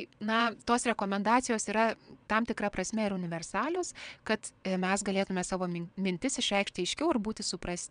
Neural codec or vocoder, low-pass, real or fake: vocoder, 22.05 kHz, 80 mel bands, WaveNeXt; 9.9 kHz; fake